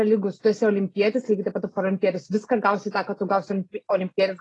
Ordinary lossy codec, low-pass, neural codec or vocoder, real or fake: AAC, 32 kbps; 10.8 kHz; none; real